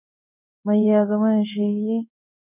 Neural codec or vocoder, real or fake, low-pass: codec, 16 kHz in and 24 kHz out, 1 kbps, XY-Tokenizer; fake; 3.6 kHz